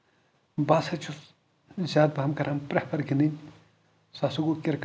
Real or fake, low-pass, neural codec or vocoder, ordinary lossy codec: real; none; none; none